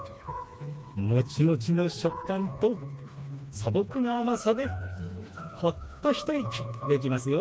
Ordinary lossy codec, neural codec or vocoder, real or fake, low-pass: none; codec, 16 kHz, 2 kbps, FreqCodec, smaller model; fake; none